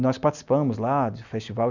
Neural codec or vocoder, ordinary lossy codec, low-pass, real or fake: none; none; 7.2 kHz; real